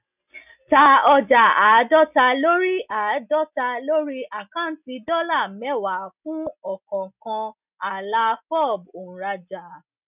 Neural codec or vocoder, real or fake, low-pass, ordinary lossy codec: none; real; 3.6 kHz; none